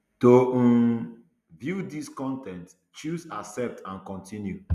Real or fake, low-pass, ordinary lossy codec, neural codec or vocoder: real; 14.4 kHz; none; none